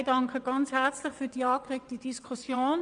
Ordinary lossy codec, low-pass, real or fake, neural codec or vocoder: MP3, 96 kbps; 9.9 kHz; fake; vocoder, 22.05 kHz, 80 mel bands, WaveNeXt